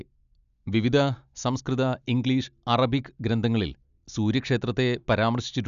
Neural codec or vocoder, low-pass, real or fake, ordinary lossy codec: none; 7.2 kHz; real; none